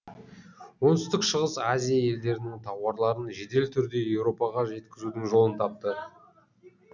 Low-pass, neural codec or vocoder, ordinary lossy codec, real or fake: 7.2 kHz; none; none; real